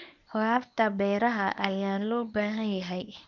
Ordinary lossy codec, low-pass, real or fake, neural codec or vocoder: none; 7.2 kHz; fake; codec, 24 kHz, 0.9 kbps, WavTokenizer, medium speech release version 1